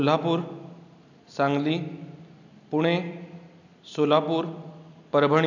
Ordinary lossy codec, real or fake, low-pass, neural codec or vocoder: none; real; 7.2 kHz; none